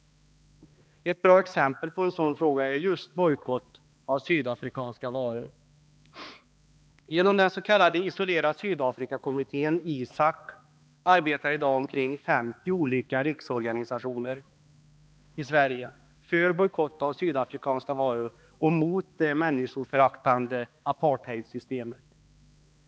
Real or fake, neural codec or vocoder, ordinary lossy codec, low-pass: fake; codec, 16 kHz, 2 kbps, X-Codec, HuBERT features, trained on balanced general audio; none; none